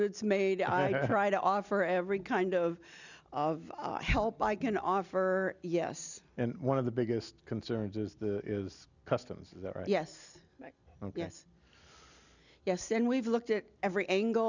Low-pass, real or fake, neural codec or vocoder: 7.2 kHz; real; none